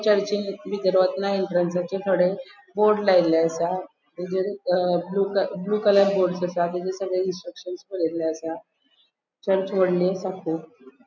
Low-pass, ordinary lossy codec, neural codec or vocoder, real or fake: 7.2 kHz; none; none; real